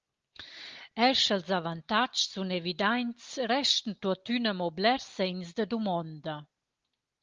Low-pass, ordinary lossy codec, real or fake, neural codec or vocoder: 7.2 kHz; Opus, 32 kbps; real; none